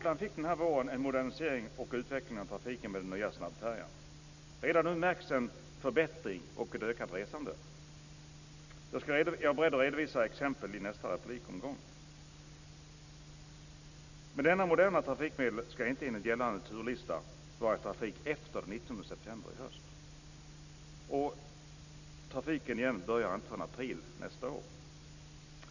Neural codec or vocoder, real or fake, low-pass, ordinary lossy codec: none; real; 7.2 kHz; none